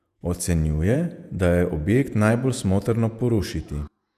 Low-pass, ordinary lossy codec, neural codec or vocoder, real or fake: 14.4 kHz; none; vocoder, 48 kHz, 128 mel bands, Vocos; fake